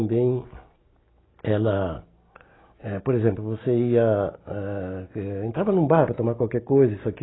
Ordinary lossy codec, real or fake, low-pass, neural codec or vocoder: AAC, 16 kbps; real; 7.2 kHz; none